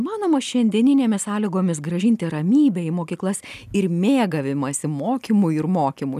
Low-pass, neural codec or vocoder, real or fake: 14.4 kHz; none; real